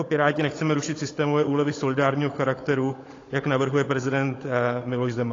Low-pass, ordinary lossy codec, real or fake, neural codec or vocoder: 7.2 kHz; AAC, 32 kbps; fake; codec, 16 kHz, 16 kbps, FunCodec, trained on Chinese and English, 50 frames a second